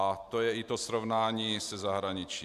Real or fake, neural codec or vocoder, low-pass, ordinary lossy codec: real; none; 14.4 kHz; AAC, 96 kbps